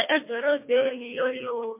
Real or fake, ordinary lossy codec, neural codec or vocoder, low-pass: fake; MP3, 24 kbps; codec, 24 kHz, 1.5 kbps, HILCodec; 3.6 kHz